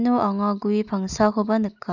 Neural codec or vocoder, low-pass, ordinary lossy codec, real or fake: none; 7.2 kHz; none; real